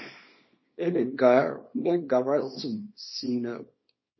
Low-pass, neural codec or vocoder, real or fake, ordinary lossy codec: 7.2 kHz; codec, 24 kHz, 0.9 kbps, WavTokenizer, small release; fake; MP3, 24 kbps